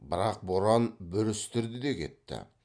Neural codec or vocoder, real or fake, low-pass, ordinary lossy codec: none; real; 9.9 kHz; none